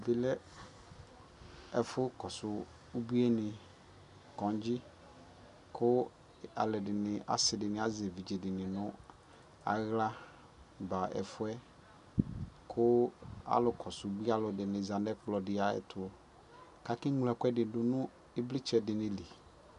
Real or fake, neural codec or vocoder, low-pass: real; none; 10.8 kHz